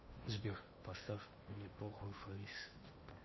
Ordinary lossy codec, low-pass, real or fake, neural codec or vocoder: MP3, 24 kbps; 7.2 kHz; fake; codec, 16 kHz in and 24 kHz out, 0.8 kbps, FocalCodec, streaming, 65536 codes